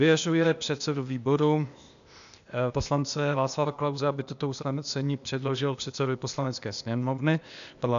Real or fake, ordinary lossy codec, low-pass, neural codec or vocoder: fake; MP3, 96 kbps; 7.2 kHz; codec, 16 kHz, 0.8 kbps, ZipCodec